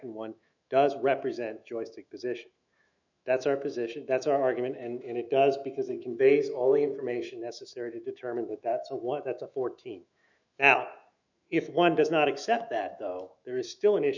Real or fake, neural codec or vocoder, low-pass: fake; autoencoder, 48 kHz, 128 numbers a frame, DAC-VAE, trained on Japanese speech; 7.2 kHz